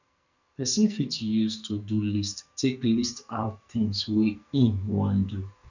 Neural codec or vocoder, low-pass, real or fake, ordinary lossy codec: codec, 44.1 kHz, 2.6 kbps, SNAC; 7.2 kHz; fake; none